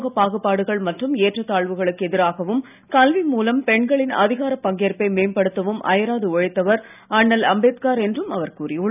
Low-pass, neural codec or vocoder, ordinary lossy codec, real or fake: 3.6 kHz; none; none; real